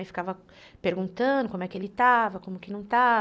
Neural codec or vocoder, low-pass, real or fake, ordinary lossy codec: none; none; real; none